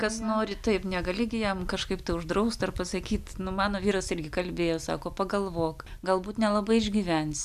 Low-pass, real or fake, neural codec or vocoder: 14.4 kHz; real; none